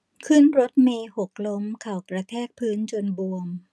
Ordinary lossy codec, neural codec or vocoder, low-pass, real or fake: none; none; none; real